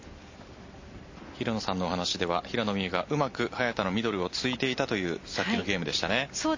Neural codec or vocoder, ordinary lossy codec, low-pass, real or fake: none; MP3, 32 kbps; 7.2 kHz; real